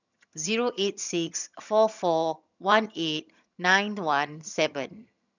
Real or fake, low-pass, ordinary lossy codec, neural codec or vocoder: fake; 7.2 kHz; none; vocoder, 22.05 kHz, 80 mel bands, HiFi-GAN